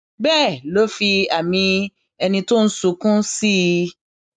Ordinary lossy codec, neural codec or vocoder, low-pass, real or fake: MP3, 96 kbps; none; 9.9 kHz; real